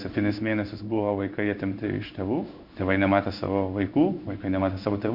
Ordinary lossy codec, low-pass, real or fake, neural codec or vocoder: Opus, 64 kbps; 5.4 kHz; fake; codec, 16 kHz in and 24 kHz out, 1 kbps, XY-Tokenizer